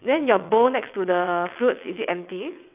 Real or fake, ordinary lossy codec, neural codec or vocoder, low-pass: fake; none; vocoder, 22.05 kHz, 80 mel bands, WaveNeXt; 3.6 kHz